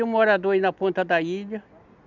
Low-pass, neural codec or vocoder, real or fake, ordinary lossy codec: 7.2 kHz; none; real; none